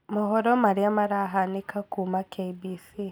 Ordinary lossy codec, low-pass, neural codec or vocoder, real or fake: none; none; none; real